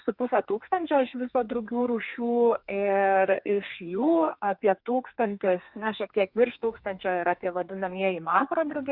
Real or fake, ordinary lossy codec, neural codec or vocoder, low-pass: fake; Opus, 64 kbps; codec, 32 kHz, 1.9 kbps, SNAC; 5.4 kHz